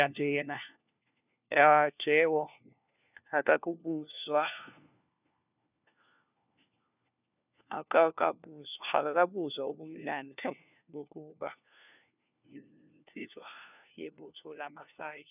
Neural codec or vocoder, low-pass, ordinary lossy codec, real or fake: codec, 16 kHz, 1 kbps, FunCodec, trained on LibriTTS, 50 frames a second; 3.6 kHz; none; fake